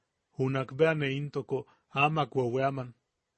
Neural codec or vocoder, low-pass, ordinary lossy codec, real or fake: none; 10.8 kHz; MP3, 32 kbps; real